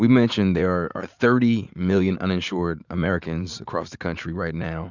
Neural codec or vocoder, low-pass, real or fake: vocoder, 44.1 kHz, 80 mel bands, Vocos; 7.2 kHz; fake